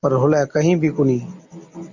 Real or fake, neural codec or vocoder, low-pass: real; none; 7.2 kHz